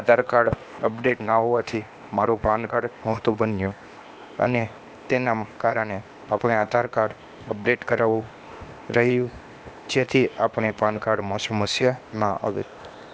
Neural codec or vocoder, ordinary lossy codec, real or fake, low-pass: codec, 16 kHz, 0.8 kbps, ZipCodec; none; fake; none